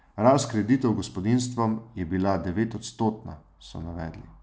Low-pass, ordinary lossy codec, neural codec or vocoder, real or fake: none; none; none; real